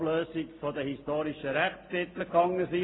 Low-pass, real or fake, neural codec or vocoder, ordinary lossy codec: 7.2 kHz; real; none; AAC, 16 kbps